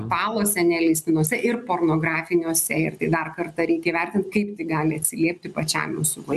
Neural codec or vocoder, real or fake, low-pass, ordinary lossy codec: none; real; 14.4 kHz; MP3, 96 kbps